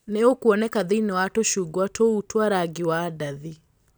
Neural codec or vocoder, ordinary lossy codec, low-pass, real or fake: none; none; none; real